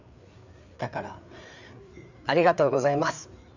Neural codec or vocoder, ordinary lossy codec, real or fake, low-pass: codec, 16 kHz, 4 kbps, FreqCodec, larger model; none; fake; 7.2 kHz